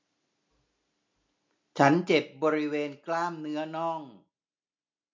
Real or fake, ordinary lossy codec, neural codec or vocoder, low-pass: real; MP3, 48 kbps; none; 7.2 kHz